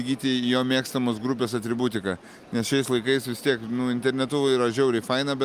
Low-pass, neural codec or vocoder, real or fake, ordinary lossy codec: 14.4 kHz; none; real; Opus, 32 kbps